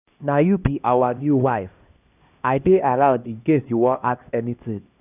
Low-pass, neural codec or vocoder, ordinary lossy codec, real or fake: 3.6 kHz; codec, 16 kHz, 1 kbps, X-Codec, HuBERT features, trained on LibriSpeech; none; fake